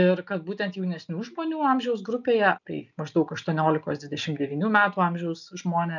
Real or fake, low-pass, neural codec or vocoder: real; 7.2 kHz; none